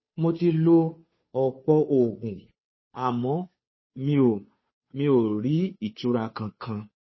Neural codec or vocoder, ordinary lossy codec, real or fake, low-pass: codec, 16 kHz, 2 kbps, FunCodec, trained on Chinese and English, 25 frames a second; MP3, 24 kbps; fake; 7.2 kHz